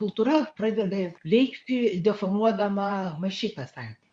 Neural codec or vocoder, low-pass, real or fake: codec, 24 kHz, 0.9 kbps, WavTokenizer, medium speech release version 2; 9.9 kHz; fake